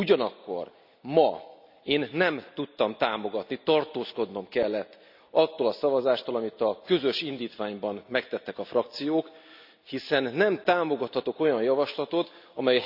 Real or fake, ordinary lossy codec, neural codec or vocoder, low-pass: real; none; none; 5.4 kHz